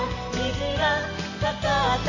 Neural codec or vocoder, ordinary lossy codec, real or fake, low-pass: none; MP3, 32 kbps; real; 7.2 kHz